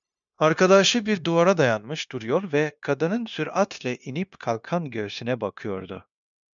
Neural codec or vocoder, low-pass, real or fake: codec, 16 kHz, 0.9 kbps, LongCat-Audio-Codec; 7.2 kHz; fake